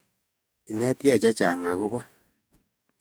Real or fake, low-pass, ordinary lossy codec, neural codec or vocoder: fake; none; none; codec, 44.1 kHz, 2.6 kbps, DAC